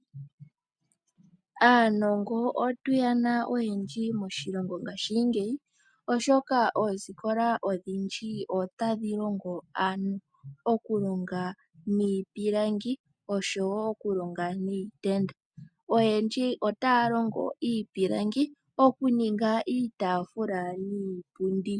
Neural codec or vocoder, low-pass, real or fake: none; 9.9 kHz; real